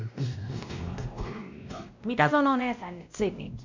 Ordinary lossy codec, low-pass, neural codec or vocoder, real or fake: MP3, 64 kbps; 7.2 kHz; codec, 16 kHz, 1 kbps, X-Codec, WavLM features, trained on Multilingual LibriSpeech; fake